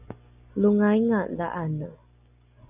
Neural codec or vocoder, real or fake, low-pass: none; real; 3.6 kHz